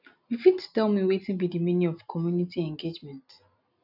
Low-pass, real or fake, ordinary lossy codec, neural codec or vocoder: 5.4 kHz; real; none; none